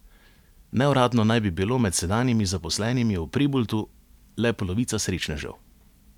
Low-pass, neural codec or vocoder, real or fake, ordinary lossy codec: 19.8 kHz; none; real; none